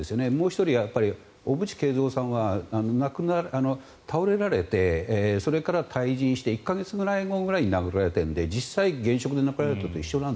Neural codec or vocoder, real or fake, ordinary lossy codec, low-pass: none; real; none; none